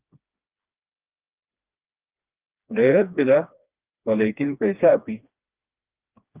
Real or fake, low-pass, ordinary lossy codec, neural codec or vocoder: fake; 3.6 kHz; Opus, 32 kbps; codec, 16 kHz, 2 kbps, FreqCodec, smaller model